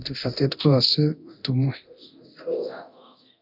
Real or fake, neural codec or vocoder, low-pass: fake; codec, 24 kHz, 0.9 kbps, DualCodec; 5.4 kHz